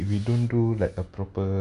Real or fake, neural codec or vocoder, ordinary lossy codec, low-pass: real; none; none; 10.8 kHz